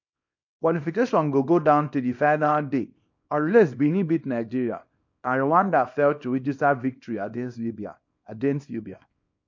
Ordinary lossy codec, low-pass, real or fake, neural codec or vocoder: MP3, 48 kbps; 7.2 kHz; fake; codec, 24 kHz, 0.9 kbps, WavTokenizer, small release